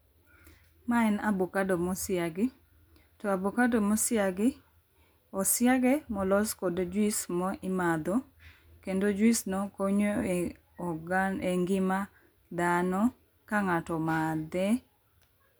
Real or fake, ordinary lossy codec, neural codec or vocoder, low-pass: real; none; none; none